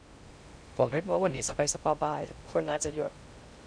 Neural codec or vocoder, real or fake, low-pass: codec, 16 kHz in and 24 kHz out, 0.6 kbps, FocalCodec, streaming, 2048 codes; fake; 9.9 kHz